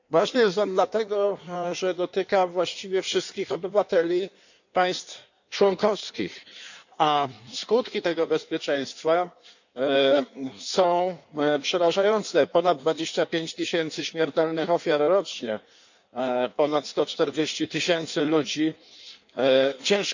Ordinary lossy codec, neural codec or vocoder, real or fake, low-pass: none; codec, 16 kHz in and 24 kHz out, 1.1 kbps, FireRedTTS-2 codec; fake; 7.2 kHz